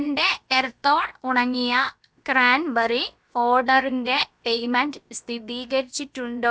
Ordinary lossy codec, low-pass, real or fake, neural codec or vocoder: none; none; fake; codec, 16 kHz, about 1 kbps, DyCAST, with the encoder's durations